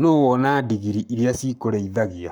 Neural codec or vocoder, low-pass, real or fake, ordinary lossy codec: codec, 44.1 kHz, 7.8 kbps, Pupu-Codec; 19.8 kHz; fake; none